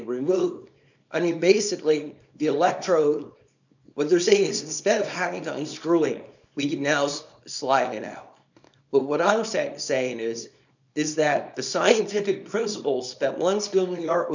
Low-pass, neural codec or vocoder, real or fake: 7.2 kHz; codec, 24 kHz, 0.9 kbps, WavTokenizer, small release; fake